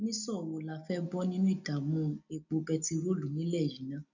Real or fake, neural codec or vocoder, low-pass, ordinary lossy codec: real; none; 7.2 kHz; none